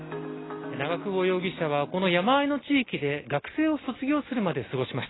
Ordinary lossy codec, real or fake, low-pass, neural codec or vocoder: AAC, 16 kbps; real; 7.2 kHz; none